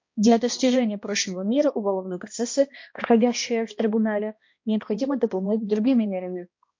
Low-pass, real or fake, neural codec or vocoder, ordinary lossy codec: 7.2 kHz; fake; codec, 16 kHz, 1 kbps, X-Codec, HuBERT features, trained on balanced general audio; MP3, 48 kbps